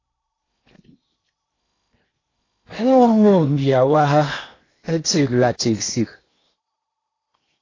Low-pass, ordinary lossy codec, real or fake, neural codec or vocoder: 7.2 kHz; AAC, 32 kbps; fake; codec, 16 kHz in and 24 kHz out, 0.8 kbps, FocalCodec, streaming, 65536 codes